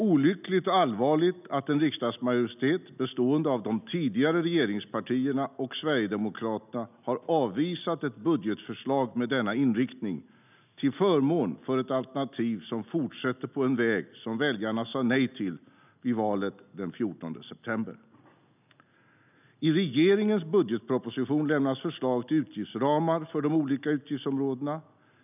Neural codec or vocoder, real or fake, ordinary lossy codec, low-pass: none; real; none; 3.6 kHz